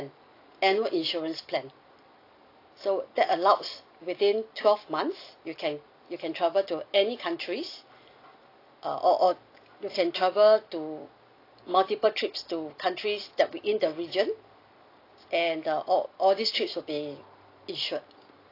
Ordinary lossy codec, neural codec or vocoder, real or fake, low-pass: AAC, 32 kbps; none; real; 5.4 kHz